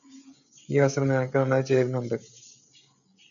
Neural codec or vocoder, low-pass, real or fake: codec, 16 kHz, 16 kbps, FreqCodec, smaller model; 7.2 kHz; fake